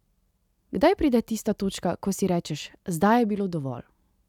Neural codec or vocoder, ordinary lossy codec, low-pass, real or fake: none; none; 19.8 kHz; real